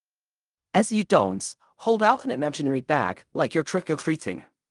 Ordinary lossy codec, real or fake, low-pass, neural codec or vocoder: Opus, 32 kbps; fake; 10.8 kHz; codec, 16 kHz in and 24 kHz out, 0.4 kbps, LongCat-Audio-Codec, fine tuned four codebook decoder